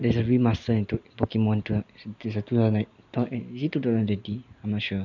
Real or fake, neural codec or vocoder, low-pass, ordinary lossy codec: real; none; 7.2 kHz; none